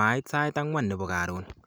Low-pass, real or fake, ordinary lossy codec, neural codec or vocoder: none; real; none; none